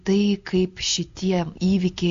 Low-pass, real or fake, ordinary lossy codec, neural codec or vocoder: 7.2 kHz; real; AAC, 48 kbps; none